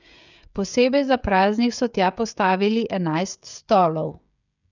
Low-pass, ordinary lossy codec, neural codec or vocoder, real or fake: 7.2 kHz; none; codec, 16 kHz, 16 kbps, FreqCodec, smaller model; fake